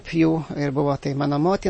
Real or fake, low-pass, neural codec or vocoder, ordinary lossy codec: real; 9.9 kHz; none; MP3, 32 kbps